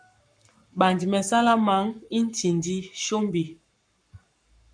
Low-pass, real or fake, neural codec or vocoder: 9.9 kHz; fake; codec, 44.1 kHz, 7.8 kbps, Pupu-Codec